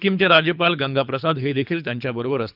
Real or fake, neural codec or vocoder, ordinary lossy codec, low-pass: fake; codec, 24 kHz, 3 kbps, HILCodec; none; 5.4 kHz